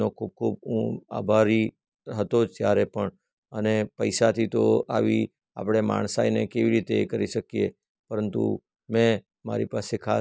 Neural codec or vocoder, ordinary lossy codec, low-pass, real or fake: none; none; none; real